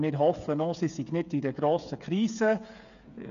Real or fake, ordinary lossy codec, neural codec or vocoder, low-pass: fake; none; codec, 16 kHz, 8 kbps, FreqCodec, smaller model; 7.2 kHz